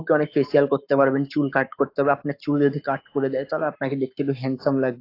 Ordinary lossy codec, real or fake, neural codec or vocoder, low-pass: AAC, 32 kbps; fake; codec, 44.1 kHz, 7.8 kbps, Pupu-Codec; 5.4 kHz